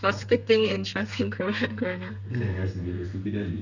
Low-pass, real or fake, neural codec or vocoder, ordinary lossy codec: 7.2 kHz; fake; codec, 32 kHz, 1.9 kbps, SNAC; none